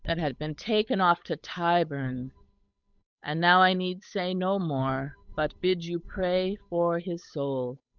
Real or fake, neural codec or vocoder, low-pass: fake; codec, 16 kHz, 8 kbps, FunCodec, trained on Chinese and English, 25 frames a second; 7.2 kHz